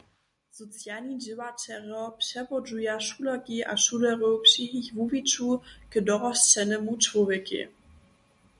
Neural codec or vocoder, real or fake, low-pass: none; real; 10.8 kHz